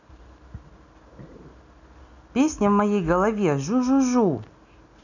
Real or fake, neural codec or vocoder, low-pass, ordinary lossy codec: real; none; 7.2 kHz; none